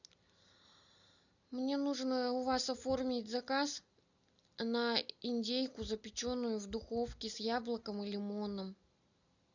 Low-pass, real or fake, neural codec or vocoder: 7.2 kHz; real; none